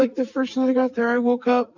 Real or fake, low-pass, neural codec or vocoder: real; 7.2 kHz; none